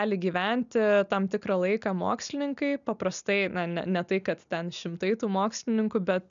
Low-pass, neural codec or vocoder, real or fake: 7.2 kHz; none; real